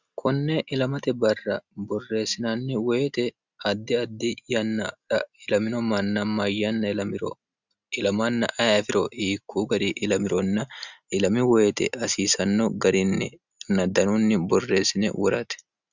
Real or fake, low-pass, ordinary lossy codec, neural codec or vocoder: real; 7.2 kHz; Opus, 64 kbps; none